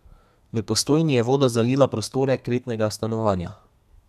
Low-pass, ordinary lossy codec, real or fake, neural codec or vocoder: 14.4 kHz; none; fake; codec, 32 kHz, 1.9 kbps, SNAC